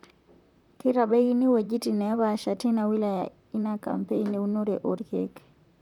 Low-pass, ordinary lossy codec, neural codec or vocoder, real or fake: 19.8 kHz; none; vocoder, 44.1 kHz, 128 mel bands, Pupu-Vocoder; fake